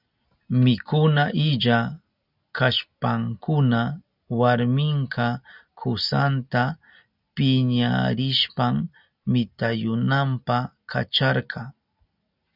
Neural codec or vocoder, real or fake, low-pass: none; real; 5.4 kHz